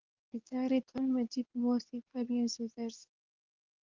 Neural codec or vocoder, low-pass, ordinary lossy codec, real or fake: codec, 24 kHz, 0.9 kbps, WavTokenizer, medium speech release version 2; 7.2 kHz; Opus, 32 kbps; fake